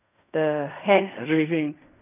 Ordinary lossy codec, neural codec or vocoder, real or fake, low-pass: none; codec, 16 kHz in and 24 kHz out, 0.4 kbps, LongCat-Audio-Codec, fine tuned four codebook decoder; fake; 3.6 kHz